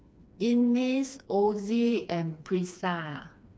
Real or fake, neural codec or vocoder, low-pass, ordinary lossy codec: fake; codec, 16 kHz, 2 kbps, FreqCodec, smaller model; none; none